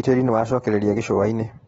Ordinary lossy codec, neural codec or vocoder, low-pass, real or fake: AAC, 24 kbps; none; 19.8 kHz; real